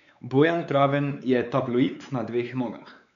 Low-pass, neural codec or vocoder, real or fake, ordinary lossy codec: 7.2 kHz; codec, 16 kHz, 4 kbps, X-Codec, WavLM features, trained on Multilingual LibriSpeech; fake; none